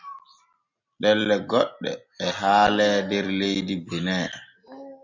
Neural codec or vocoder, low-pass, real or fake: none; 7.2 kHz; real